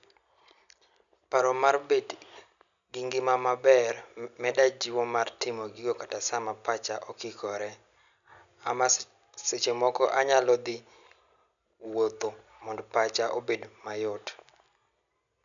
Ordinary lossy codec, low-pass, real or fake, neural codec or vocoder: none; 7.2 kHz; real; none